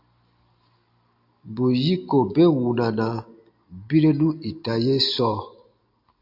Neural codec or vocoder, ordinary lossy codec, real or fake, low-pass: none; AAC, 48 kbps; real; 5.4 kHz